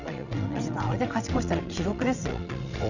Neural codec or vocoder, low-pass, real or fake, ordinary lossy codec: vocoder, 22.05 kHz, 80 mel bands, WaveNeXt; 7.2 kHz; fake; none